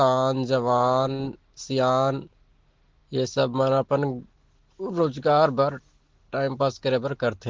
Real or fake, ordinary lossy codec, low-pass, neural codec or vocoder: real; Opus, 16 kbps; 7.2 kHz; none